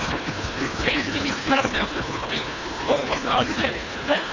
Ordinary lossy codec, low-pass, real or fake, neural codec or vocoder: AAC, 32 kbps; 7.2 kHz; fake; codec, 24 kHz, 1.5 kbps, HILCodec